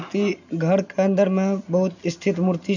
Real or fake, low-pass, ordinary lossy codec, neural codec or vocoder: real; 7.2 kHz; none; none